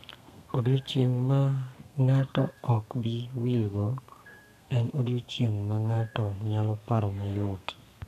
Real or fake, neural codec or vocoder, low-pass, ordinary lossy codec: fake; codec, 32 kHz, 1.9 kbps, SNAC; 14.4 kHz; none